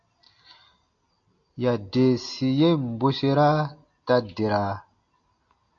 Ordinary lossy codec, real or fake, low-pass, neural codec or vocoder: AAC, 48 kbps; real; 7.2 kHz; none